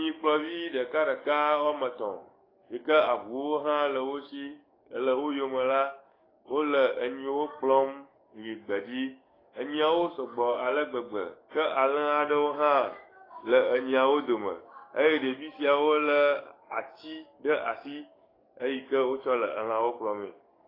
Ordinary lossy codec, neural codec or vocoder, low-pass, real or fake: AAC, 24 kbps; codec, 44.1 kHz, 7.8 kbps, DAC; 5.4 kHz; fake